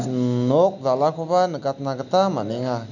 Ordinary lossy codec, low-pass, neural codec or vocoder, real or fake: none; 7.2 kHz; none; real